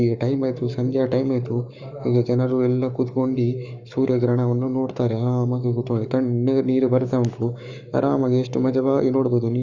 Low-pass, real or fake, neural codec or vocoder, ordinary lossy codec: 7.2 kHz; fake; codec, 44.1 kHz, 7.8 kbps, Pupu-Codec; Opus, 64 kbps